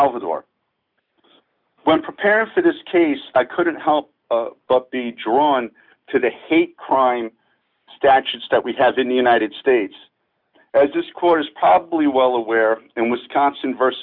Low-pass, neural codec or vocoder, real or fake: 5.4 kHz; none; real